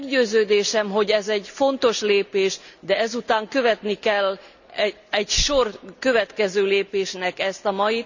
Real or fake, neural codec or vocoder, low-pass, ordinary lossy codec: real; none; 7.2 kHz; none